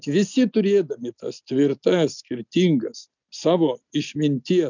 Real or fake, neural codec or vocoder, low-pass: real; none; 7.2 kHz